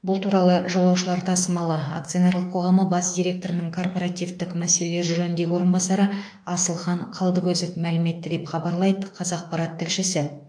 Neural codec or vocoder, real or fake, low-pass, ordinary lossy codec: autoencoder, 48 kHz, 32 numbers a frame, DAC-VAE, trained on Japanese speech; fake; 9.9 kHz; AAC, 64 kbps